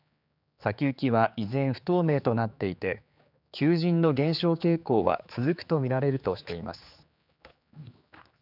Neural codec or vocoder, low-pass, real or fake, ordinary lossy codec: codec, 16 kHz, 4 kbps, X-Codec, HuBERT features, trained on general audio; 5.4 kHz; fake; none